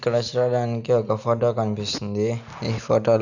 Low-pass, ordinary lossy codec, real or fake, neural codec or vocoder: 7.2 kHz; none; real; none